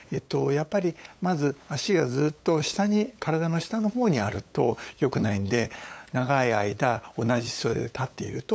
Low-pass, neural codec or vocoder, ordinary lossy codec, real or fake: none; codec, 16 kHz, 8 kbps, FunCodec, trained on LibriTTS, 25 frames a second; none; fake